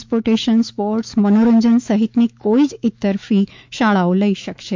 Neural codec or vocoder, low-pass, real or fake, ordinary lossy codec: autoencoder, 48 kHz, 128 numbers a frame, DAC-VAE, trained on Japanese speech; 7.2 kHz; fake; MP3, 64 kbps